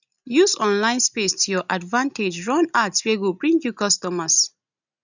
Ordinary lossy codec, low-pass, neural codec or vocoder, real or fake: none; 7.2 kHz; none; real